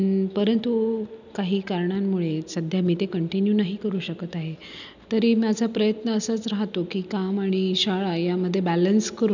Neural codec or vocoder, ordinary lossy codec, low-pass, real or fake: none; none; 7.2 kHz; real